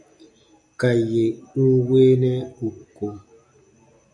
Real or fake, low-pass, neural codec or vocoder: real; 10.8 kHz; none